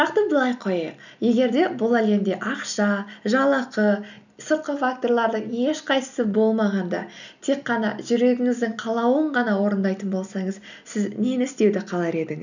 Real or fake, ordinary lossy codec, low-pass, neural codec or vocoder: real; none; 7.2 kHz; none